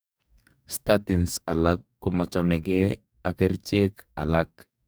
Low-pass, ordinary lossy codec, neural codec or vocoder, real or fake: none; none; codec, 44.1 kHz, 2.6 kbps, DAC; fake